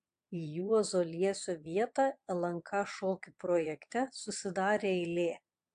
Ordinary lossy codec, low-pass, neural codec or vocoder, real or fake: AAC, 64 kbps; 9.9 kHz; vocoder, 22.05 kHz, 80 mel bands, Vocos; fake